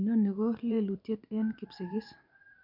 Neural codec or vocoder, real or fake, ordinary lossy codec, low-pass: vocoder, 44.1 kHz, 128 mel bands every 512 samples, BigVGAN v2; fake; none; 5.4 kHz